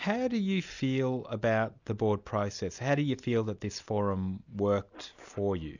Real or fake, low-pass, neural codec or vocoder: real; 7.2 kHz; none